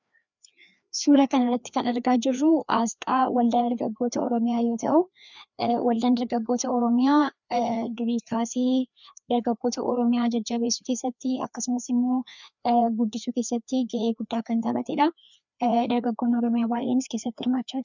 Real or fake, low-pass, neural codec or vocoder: fake; 7.2 kHz; codec, 16 kHz, 2 kbps, FreqCodec, larger model